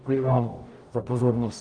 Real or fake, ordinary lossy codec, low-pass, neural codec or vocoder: fake; Opus, 32 kbps; 9.9 kHz; codec, 44.1 kHz, 0.9 kbps, DAC